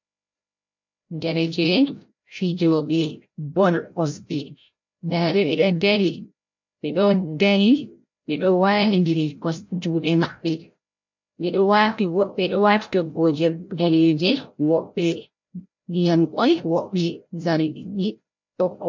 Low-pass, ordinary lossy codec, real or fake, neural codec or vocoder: 7.2 kHz; MP3, 48 kbps; fake; codec, 16 kHz, 0.5 kbps, FreqCodec, larger model